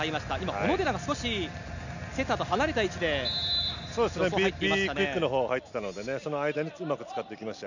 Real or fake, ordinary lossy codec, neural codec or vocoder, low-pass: real; none; none; 7.2 kHz